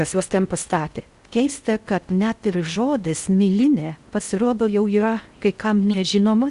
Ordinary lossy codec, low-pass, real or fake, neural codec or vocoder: Opus, 64 kbps; 10.8 kHz; fake; codec, 16 kHz in and 24 kHz out, 0.6 kbps, FocalCodec, streaming, 4096 codes